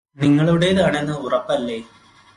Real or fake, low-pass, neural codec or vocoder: real; 10.8 kHz; none